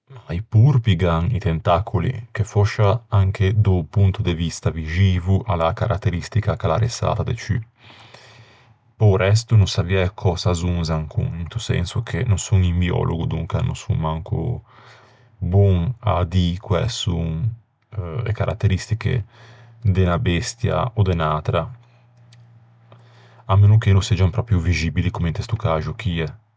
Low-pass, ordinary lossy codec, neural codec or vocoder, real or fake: none; none; none; real